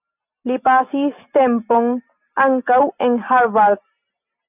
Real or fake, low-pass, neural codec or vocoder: real; 3.6 kHz; none